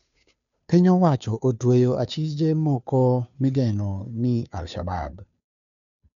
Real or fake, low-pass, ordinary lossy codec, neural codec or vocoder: fake; 7.2 kHz; none; codec, 16 kHz, 2 kbps, FunCodec, trained on Chinese and English, 25 frames a second